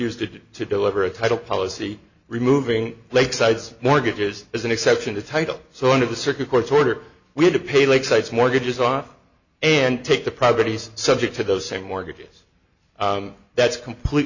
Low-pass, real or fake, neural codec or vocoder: 7.2 kHz; real; none